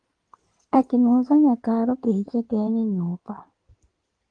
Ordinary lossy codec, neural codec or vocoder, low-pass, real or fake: Opus, 24 kbps; codec, 16 kHz in and 24 kHz out, 2.2 kbps, FireRedTTS-2 codec; 9.9 kHz; fake